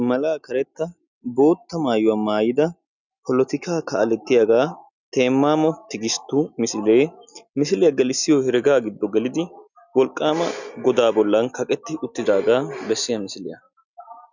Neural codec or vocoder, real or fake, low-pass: none; real; 7.2 kHz